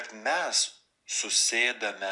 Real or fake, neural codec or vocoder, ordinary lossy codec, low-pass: real; none; MP3, 96 kbps; 10.8 kHz